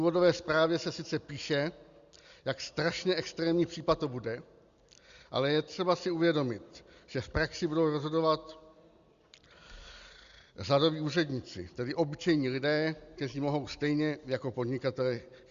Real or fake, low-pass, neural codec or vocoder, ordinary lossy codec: real; 7.2 kHz; none; Opus, 64 kbps